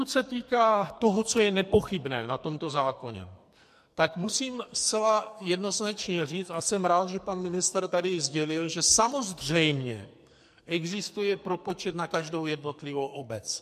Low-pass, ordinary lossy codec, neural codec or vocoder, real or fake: 14.4 kHz; MP3, 64 kbps; codec, 44.1 kHz, 2.6 kbps, SNAC; fake